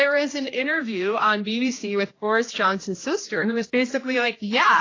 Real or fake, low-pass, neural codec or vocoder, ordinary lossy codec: fake; 7.2 kHz; codec, 16 kHz, 1 kbps, X-Codec, HuBERT features, trained on general audio; AAC, 32 kbps